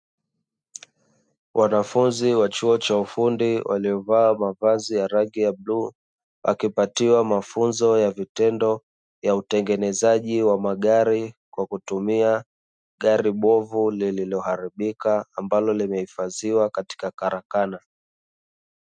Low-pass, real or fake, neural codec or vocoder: 9.9 kHz; real; none